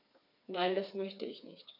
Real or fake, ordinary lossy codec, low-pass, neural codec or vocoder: fake; none; 5.4 kHz; codec, 16 kHz, 4 kbps, FreqCodec, smaller model